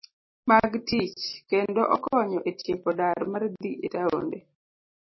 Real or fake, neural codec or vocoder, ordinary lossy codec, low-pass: real; none; MP3, 24 kbps; 7.2 kHz